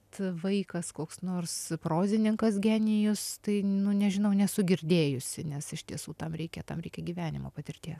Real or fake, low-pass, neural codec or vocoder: real; 14.4 kHz; none